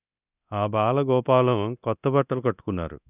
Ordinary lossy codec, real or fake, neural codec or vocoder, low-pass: none; fake; codec, 24 kHz, 0.9 kbps, DualCodec; 3.6 kHz